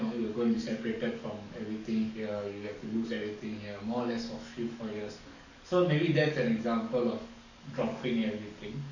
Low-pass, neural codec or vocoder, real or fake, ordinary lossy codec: 7.2 kHz; codec, 44.1 kHz, 7.8 kbps, DAC; fake; none